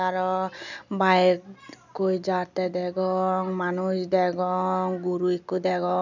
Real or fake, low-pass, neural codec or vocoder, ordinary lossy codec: real; 7.2 kHz; none; none